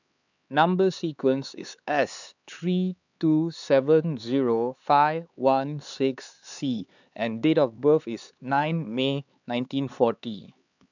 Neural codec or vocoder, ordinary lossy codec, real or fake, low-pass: codec, 16 kHz, 4 kbps, X-Codec, HuBERT features, trained on LibriSpeech; none; fake; 7.2 kHz